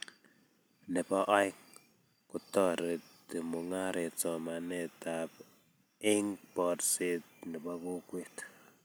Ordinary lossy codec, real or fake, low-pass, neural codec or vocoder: none; fake; none; vocoder, 44.1 kHz, 128 mel bands every 256 samples, BigVGAN v2